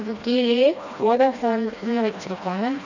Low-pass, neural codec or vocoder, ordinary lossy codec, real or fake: 7.2 kHz; codec, 16 kHz, 1 kbps, FreqCodec, smaller model; none; fake